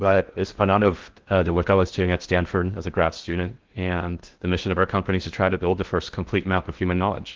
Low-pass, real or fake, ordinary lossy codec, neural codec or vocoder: 7.2 kHz; fake; Opus, 16 kbps; codec, 16 kHz in and 24 kHz out, 0.6 kbps, FocalCodec, streaming, 4096 codes